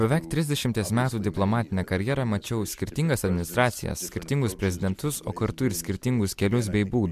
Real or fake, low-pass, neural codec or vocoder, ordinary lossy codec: real; 14.4 kHz; none; MP3, 96 kbps